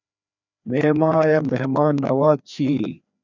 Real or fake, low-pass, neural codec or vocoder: fake; 7.2 kHz; codec, 16 kHz, 2 kbps, FreqCodec, larger model